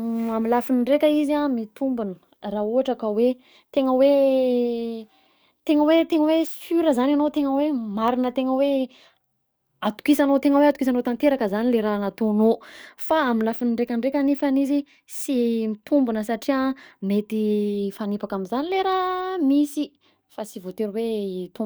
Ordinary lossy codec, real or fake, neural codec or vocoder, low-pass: none; fake; codec, 44.1 kHz, 7.8 kbps, DAC; none